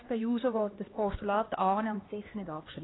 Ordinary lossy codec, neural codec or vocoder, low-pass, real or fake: AAC, 16 kbps; codec, 16 kHz, 2 kbps, X-Codec, HuBERT features, trained on LibriSpeech; 7.2 kHz; fake